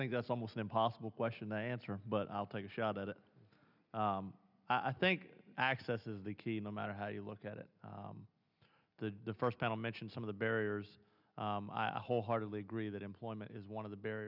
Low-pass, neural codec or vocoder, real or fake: 5.4 kHz; none; real